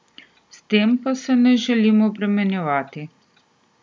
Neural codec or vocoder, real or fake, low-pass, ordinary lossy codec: none; real; none; none